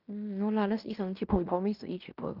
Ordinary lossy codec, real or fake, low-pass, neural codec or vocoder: Opus, 24 kbps; fake; 5.4 kHz; codec, 16 kHz in and 24 kHz out, 0.9 kbps, LongCat-Audio-Codec, fine tuned four codebook decoder